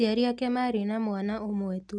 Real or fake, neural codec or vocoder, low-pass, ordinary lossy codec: real; none; 9.9 kHz; MP3, 96 kbps